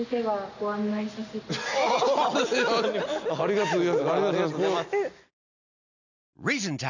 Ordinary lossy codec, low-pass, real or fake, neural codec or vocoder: none; 7.2 kHz; real; none